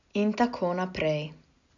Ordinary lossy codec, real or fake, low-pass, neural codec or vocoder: none; real; 7.2 kHz; none